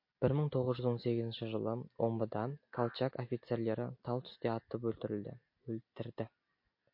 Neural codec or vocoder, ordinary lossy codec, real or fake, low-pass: none; MP3, 32 kbps; real; 5.4 kHz